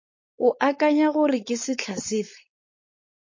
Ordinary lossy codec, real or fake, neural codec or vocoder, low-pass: MP3, 32 kbps; fake; codec, 16 kHz, 4.8 kbps, FACodec; 7.2 kHz